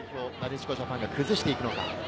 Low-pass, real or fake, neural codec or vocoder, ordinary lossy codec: none; real; none; none